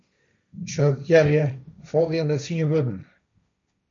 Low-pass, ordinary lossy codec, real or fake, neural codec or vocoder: 7.2 kHz; MP3, 64 kbps; fake; codec, 16 kHz, 1.1 kbps, Voila-Tokenizer